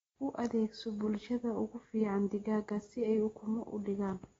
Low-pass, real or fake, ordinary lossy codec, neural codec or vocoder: 19.8 kHz; real; AAC, 24 kbps; none